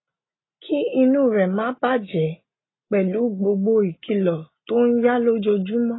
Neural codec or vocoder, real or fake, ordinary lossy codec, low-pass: none; real; AAC, 16 kbps; 7.2 kHz